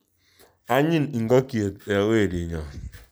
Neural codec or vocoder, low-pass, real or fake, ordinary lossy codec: none; none; real; none